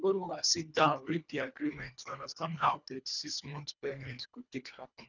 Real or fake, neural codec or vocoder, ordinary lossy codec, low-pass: fake; codec, 24 kHz, 1.5 kbps, HILCodec; none; 7.2 kHz